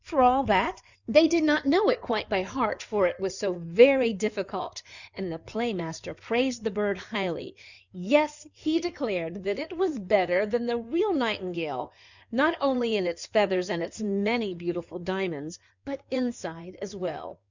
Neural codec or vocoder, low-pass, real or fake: codec, 16 kHz in and 24 kHz out, 2.2 kbps, FireRedTTS-2 codec; 7.2 kHz; fake